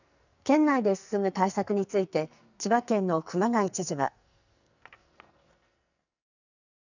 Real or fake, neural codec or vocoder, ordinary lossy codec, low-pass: fake; codec, 44.1 kHz, 2.6 kbps, SNAC; none; 7.2 kHz